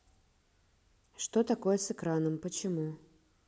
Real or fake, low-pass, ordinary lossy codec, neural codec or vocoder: real; none; none; none